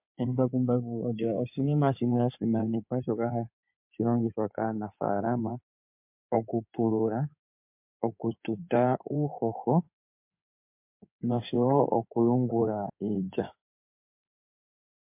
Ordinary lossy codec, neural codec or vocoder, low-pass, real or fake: MP3, 32 kbps; codec, 16 kHz in and 24 kHz out, 2.2 kbps, FireRedTTS-2 codec; 3.6 kHz; fake